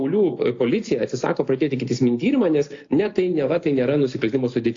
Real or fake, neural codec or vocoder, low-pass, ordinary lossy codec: real; none; 7.2 kHz; AAC, 48 kbps